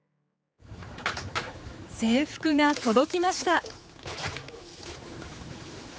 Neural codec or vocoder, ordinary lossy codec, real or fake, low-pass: codec, 16 kHz, 4 kbps, X-Codec, HuBERT features, trained on general audio; none; fake; none